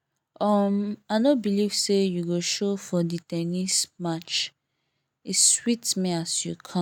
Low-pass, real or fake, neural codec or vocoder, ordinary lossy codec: 19.8 kHz; real; none; none